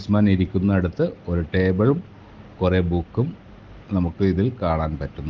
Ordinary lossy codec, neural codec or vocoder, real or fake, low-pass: Opus, 16 kbps; none; real; 7.2 kHz